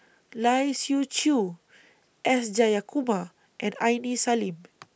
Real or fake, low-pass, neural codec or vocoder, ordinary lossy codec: real; none; none; none